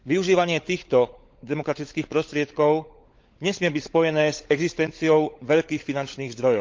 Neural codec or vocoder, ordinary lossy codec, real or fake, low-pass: codec, 16 kHz, 16 kbps, FunCodec, trained on LibriTTS, 50 frames a second; Opus, 32 kbps; fake; 7.2 kHz